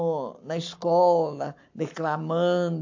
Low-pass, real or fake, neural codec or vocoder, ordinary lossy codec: 7.2 kHz; fake; codec, 44.1 kHz, 7.8 kbps, Pupu-Codec; AAC, 48 kbps